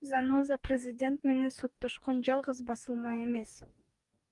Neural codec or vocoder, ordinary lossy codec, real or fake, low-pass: codec, 44.1 kHz, 2.6 kbps, DAC; Opus, 32 kbps; fake; 10.8 kHz